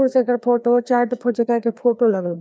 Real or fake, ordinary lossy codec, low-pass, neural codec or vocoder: fake; none; none; codec, 16 kHz, 2 kbps, FreqCodec, larger model